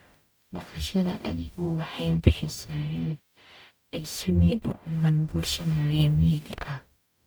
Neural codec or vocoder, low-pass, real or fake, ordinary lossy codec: codec, 44.1 kHz, 0.9 kbps, DAC; none; fake; none